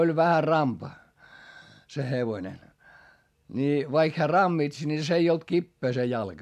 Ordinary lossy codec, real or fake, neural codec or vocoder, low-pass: AAC, 96 kbps; real; none; 14.4 kHz